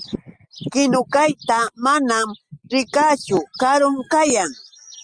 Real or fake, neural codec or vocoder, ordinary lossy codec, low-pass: real; none; Opus, 32 kbps; 9.9 kHz